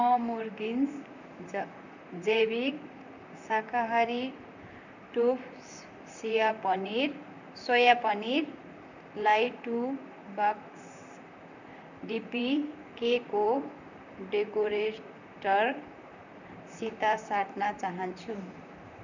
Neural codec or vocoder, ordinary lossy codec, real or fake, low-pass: vocoder, 44.1 kHz, 128 mel bands, Pupu-Vocoder; none; fake; 7.2 kHz